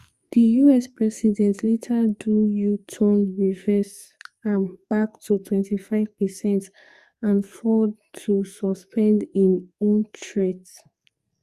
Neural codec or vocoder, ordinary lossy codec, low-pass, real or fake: codec, 44.1 kHz, 2.6 kbps, SNAC; Opus, 64 kbps; 14.4 kHz; fake